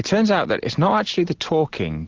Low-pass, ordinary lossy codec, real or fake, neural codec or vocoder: 7.2 kHz; Opus, 16 kbps; real; none